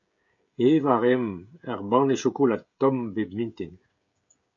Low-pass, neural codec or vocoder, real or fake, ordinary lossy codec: 7.2 kHz; codec, 16 kHz, 16 kbps, FreqCodec, smaller model; fake; AAC, 64 kbps